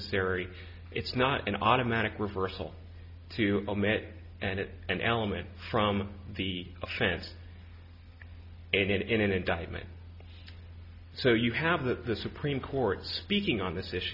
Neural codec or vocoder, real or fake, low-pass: none; real; 5.4 kHz